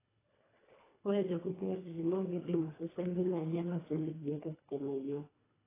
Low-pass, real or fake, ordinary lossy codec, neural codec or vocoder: 3.6 kHz; fake; AAC, 16 kbps; codec, 24 kHz, 1.5 kbps, HILCodec